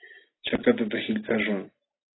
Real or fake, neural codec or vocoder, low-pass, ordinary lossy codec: real; none; 7.2 kHz; AAC, 16 kbps